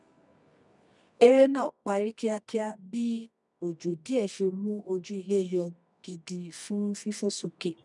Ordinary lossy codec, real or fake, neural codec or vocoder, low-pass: none; fake; codec, 24 kHz, 0.9 kbps, WavTokenizer, medium music audio release; 10.8 kHz